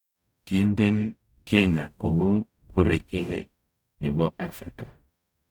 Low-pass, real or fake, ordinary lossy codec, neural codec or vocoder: 19.8 kHz; fake; none; codec, 44.1 kHz, 0.9 kbps, DAC